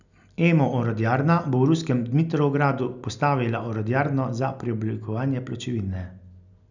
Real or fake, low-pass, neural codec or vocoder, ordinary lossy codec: real; 7.2 kHz; none; none